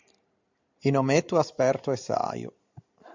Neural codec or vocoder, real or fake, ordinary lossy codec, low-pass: none; real; MP3, 64 kbps; 7.2 kHz